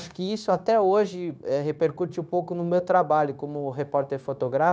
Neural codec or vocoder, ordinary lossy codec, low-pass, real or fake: codec, 16 kHz, 0.9 kbps, LongCat-Audio-Codec; none; none; fake